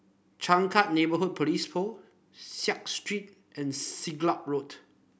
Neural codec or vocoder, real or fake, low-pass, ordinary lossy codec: none; real; none; none